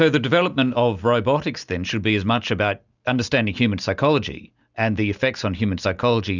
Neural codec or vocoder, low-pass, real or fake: none; 7.2 kHz; real